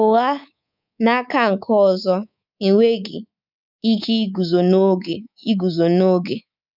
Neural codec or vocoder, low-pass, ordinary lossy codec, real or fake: codec, 24 kHz, 3.1 kbps, DualCodec; 5.4 kHz; none; fake